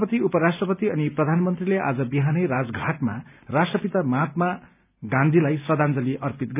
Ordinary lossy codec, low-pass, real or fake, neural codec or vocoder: none; 3.6 kHz; real; none